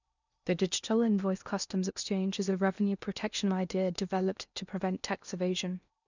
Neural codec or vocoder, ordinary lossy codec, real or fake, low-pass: codec, 16 kHz in and 24 kHz out, 0.8 kbps, FocalCodec, streaming, 65536 codes; none; fake; 7.2 kHz